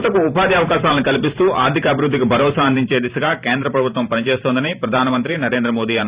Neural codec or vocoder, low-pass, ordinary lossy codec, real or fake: vocoder, 44.1 kHz, 128 mel bands every 512 samples, BigVGAN v2; 3.6 kHz; Opus, 64 kbps; fake